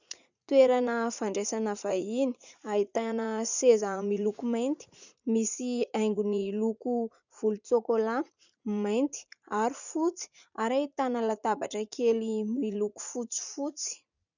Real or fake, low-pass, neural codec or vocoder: fake; 7.2 kHz; vocoder, 44.1 kHz, 80 mel bands, Vocos